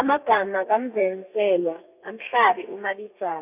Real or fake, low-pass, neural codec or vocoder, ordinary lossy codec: fake; 3.6 kHz; codec, 32 kHz, 1.9 kbps, SNAC; none